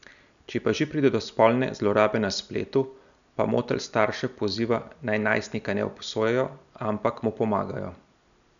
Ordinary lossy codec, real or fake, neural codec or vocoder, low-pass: none; real; none; 7.2 kHz